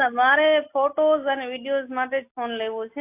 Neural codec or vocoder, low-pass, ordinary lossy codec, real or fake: none; 3.6 kHz; AAC, 24 kbps; real